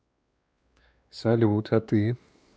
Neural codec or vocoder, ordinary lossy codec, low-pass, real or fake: codec, 16 kHz, 2 kbps, X-Codec, WavLM features, trained on Multilingual LibriSpeech; none; none; fake